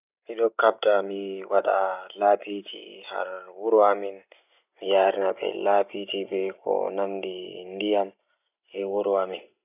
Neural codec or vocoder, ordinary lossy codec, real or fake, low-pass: none; none; real; 3.6 kHz